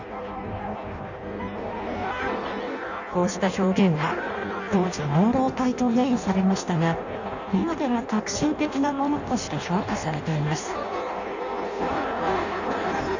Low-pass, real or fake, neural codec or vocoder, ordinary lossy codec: 7.2 kHz; fake; codec, 16 kHz in and 24 kHz out, 0.6 kbps, FireRedTTS-2 codec; none